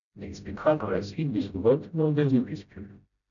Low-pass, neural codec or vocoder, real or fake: 7.2 kHz; codec, 16 kHz, 0.5 kbps, FreqCodec, smaller model; fake